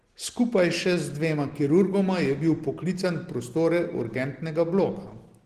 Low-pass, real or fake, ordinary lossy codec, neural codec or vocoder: 14.4 kHz; real; Opus, 16 kbps; none